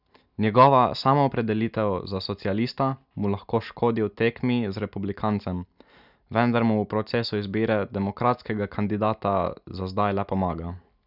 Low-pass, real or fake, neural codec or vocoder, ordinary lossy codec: 5.4 kHz; real; none; none